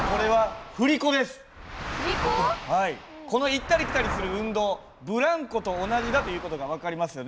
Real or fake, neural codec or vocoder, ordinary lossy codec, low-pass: real; none; none; none